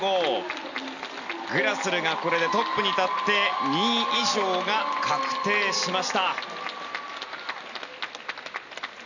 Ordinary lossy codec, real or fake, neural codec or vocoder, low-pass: none; real; none; 7.2 kHz